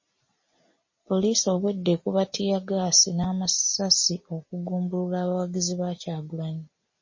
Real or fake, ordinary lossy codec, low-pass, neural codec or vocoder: real; MP3, 32 kbps; 7.2 kHz; none